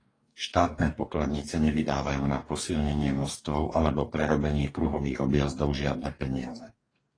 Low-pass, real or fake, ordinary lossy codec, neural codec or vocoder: 9.9 kHz; fake; AAC, 32 kbps; codec, 44.1 kHz, 2.6 kbps, DAC